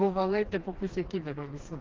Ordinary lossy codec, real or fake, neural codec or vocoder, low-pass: Opus, 16 kbps; fake; codec, 16 kHz, 2 kbps, FreqCodec, smaller model; 7.2 kHz